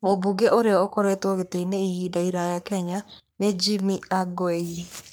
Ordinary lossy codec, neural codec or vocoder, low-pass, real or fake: none; codec, 44.1 kHz, 3.4 kbps, Pupu-Codec; none; fake